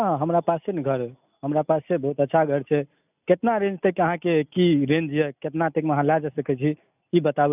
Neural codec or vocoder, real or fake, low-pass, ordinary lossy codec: none; real; 3.6 kHz; none